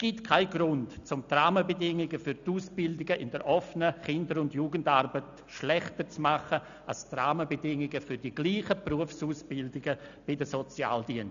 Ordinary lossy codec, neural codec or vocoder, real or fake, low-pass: none; none; real; 7.2 kHz